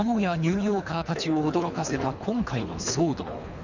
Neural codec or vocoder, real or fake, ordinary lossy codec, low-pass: codec, 24 kHz, 3 kbps, HILCodec; fake; none; 7.2 kHz